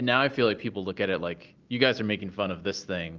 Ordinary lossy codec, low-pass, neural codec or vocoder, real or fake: Opus, 24 kbps; 7.2 kHz; none; real